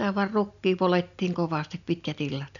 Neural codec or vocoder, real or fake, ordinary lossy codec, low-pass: none; real; none; 7.2 kHz